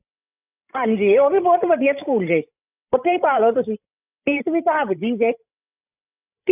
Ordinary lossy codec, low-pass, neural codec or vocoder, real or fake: none; 3.6 kHz; codec, 16 kHz, 16 kbps, FreqCodec, larger model; fake